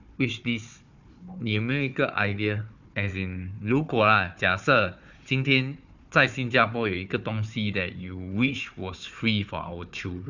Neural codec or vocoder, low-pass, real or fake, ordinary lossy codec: codec, 16 kHz, 4 kbps, FunCodec, trained on Chinese and English, 50 frames a second; 7.2 kHz; fake; none